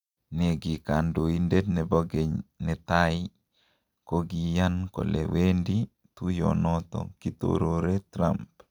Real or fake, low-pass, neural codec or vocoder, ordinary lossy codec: real; 19.8 kHz; none; none